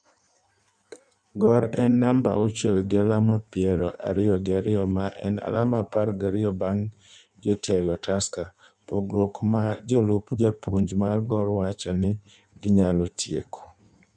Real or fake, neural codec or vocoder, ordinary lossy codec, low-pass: fake; codec, 16 kHz in and 24 kHz out, 1.1 kbps, FireRedTTS-2 codec; none; 9.9 kHz